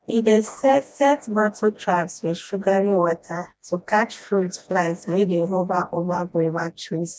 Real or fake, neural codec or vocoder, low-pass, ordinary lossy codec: fake; codec, 16 kHz, 1 kbps, FreqCodec, smaller model; none; none